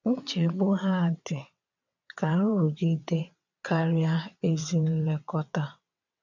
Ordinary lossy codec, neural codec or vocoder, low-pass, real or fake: none; vocoder, 22.05 kHz, 80 mel bands, WaveNeXt; 7.2 kHz; fake